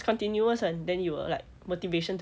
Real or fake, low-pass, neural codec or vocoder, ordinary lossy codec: real; none; none; none